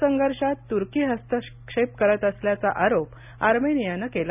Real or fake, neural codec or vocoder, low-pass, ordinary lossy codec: real; none; 3.6 kHz; none